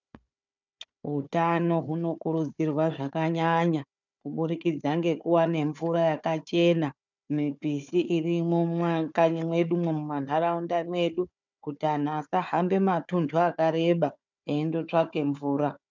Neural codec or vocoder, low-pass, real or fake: codec, 16 kHz, 4 kbps, FunCodec, trained on Chinese and English, 50 frames a second; 7.2 kHz; fake